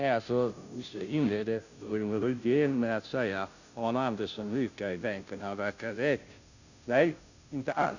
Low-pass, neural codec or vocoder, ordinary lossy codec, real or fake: 7.2 kHz; codec, 16 kHz, 0.5 kbps, FunCodec, trained on Chinese and English, 25 frames a second; none; fake